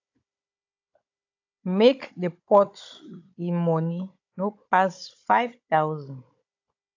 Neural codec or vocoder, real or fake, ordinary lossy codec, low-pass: codec, 16 kHz, 16 kbps, FunCodec, trained on Chinese and English, 50 frames a second; fake; AAC, 48 kbps; 7.2 kHz